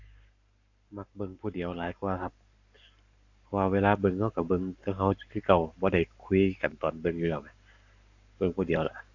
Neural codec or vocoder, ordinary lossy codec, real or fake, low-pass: codec, 44.1 kHz, 7.8 kbps, Pupu-Codec; AAC, 48 kbps; fake; 7.2 kHz